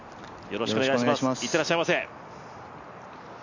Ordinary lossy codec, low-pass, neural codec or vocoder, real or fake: none; 7.2 kHz; none; real